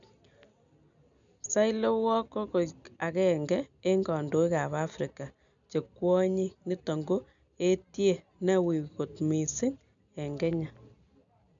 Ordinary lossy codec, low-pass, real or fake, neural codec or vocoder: none; 7.2 kHz; real; none